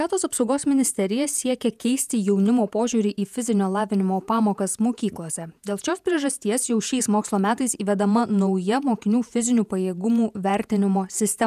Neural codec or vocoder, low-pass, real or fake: vocoder, 48 kHz, 128 mel bands, Vocos; 14.4 kHz; fake